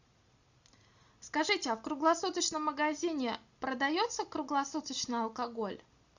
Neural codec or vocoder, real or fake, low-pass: none; real; 7.2 kHz